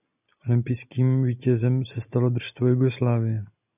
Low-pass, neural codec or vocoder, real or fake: 3.6 kHz; none; real